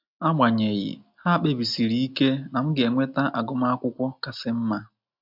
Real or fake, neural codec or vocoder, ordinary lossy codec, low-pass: real; none; MP3, 48 kbps; 5.4 kHz